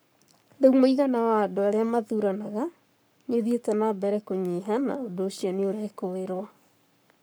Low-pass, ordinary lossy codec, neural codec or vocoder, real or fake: none; none; codec, 44.1 kHz, 7.8 kbps, Pupu-Codec; fake